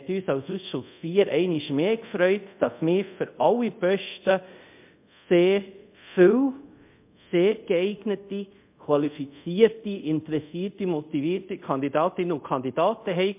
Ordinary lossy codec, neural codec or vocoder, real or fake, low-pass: MP3, 32 kbps; codec, 24 kHz, 0.5 kbps, DualCodec; fake; 3.6 kHz